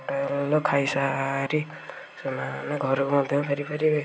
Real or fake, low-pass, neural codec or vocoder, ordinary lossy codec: real; none; none; none